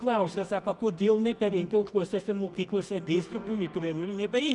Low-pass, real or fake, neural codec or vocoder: 10.8 kHz; fake; codec, 24 kHz, 0.9 kbps, WavTokenizer, medium music audio release